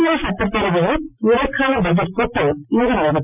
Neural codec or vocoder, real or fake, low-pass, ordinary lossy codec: none; real; 3.6 kHz; none